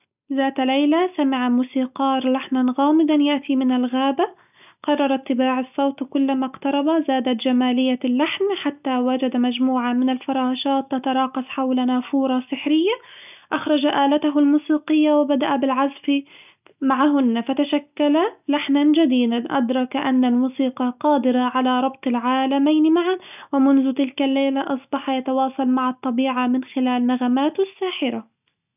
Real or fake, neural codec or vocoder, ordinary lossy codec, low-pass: real; none; none; 3.6 kHz